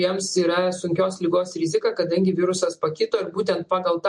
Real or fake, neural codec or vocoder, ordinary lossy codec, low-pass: real; none; MP3, 48 kbps; 10.8 kHz